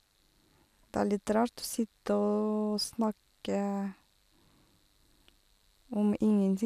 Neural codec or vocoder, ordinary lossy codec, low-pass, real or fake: none; none; 14.4 kHz; real